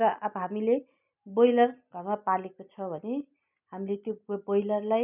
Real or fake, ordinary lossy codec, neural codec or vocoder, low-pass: real; none; none; 3.6 kHz